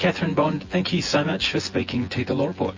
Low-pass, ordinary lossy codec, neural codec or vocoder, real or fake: 7.2 kHz; MP3, 32 kbps; vocoder, 24 kHz, 100 mel bands, Vocos; fake